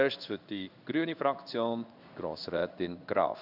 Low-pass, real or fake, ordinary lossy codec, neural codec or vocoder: 5.4 kHz; fake; none; codec, 16 kHz in and 24 kHz out, 1 kbps, XY-Tokenizer